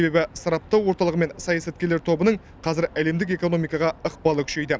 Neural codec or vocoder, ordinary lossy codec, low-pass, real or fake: none; none; none; real